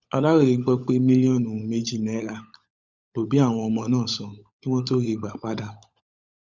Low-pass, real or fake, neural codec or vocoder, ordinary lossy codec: 7.2 kHz; fake; codec, 16 kHz, 16 kbps, FunCodec, trained on LibriTTS, 50 frames a second; Opus, 64 kbps